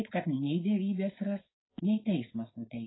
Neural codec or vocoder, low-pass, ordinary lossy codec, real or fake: codec, 16 kHz, 4.8 kbps, FACodec; 7.2 kHz; AAC, 16 kbps; fake